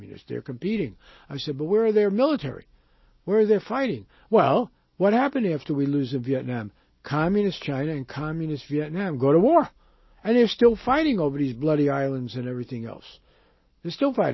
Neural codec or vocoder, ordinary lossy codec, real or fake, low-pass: none; MP3, 24 kbps; real; 7.2 kHz